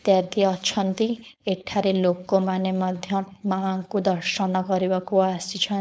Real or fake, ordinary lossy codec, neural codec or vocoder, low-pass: fake; none; codec, 16 kHz, 4.8 kbps, FACodec; none